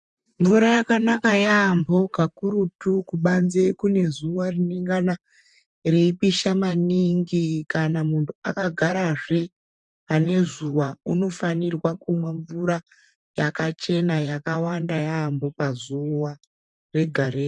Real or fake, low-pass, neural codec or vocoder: fake; 10.8 kHz; vocoder, 44.1 kHz, 128 mel bands, Pupu-Vocoder